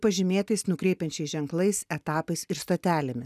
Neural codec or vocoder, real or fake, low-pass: none; real; 14.4 kHz